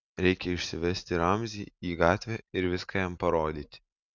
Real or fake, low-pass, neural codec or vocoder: real; 7.2 kHz; none